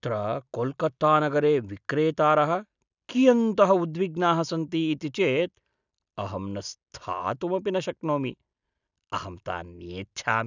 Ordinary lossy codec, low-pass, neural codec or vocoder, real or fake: none; 7.2 kHz; none; real